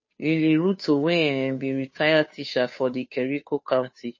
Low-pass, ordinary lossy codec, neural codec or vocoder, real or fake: 7.2 kHz; MP3, 32 kbps; codec, 16 kHz, 2 kbps, FunCodec, trained on Chinese and English, 25 frames a second; fake